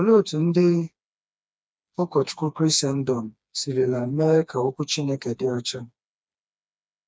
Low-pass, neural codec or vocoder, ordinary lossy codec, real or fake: none; codec, 16 kHz, 2 kbps, FreqCodec, smaller model; none; fake